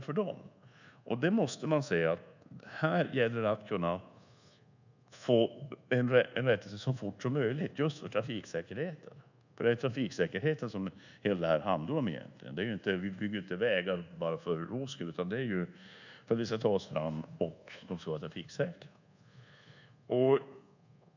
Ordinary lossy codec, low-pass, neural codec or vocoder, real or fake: none; 7.2 kHz; codec, 24 kHz, 1.2 kbps, DualCodec; fake